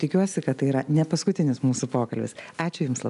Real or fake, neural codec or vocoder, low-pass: real; none; 10.8 kHz